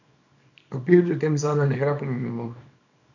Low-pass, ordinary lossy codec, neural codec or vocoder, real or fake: 7.2 kHz; none; codec, 24 kHz, 0.9 kbps, WavTokenizer, small release; fake